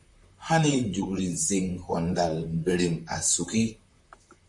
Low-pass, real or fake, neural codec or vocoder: 10.8 kHz; fake; vocoder, 44.1 kHz, 128 mel bands, Pupu-Vocoder